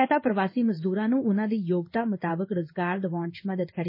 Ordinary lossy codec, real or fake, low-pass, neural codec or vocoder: MP3, 24 kbps; fake; 5.4 kHz; codec, 16 kHz in and 24 kHz out, 1 kbps, XY-Tokenizer